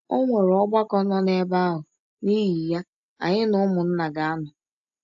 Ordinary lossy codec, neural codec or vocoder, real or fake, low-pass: AAC, 64 kbps; none; real; 7.2 kHz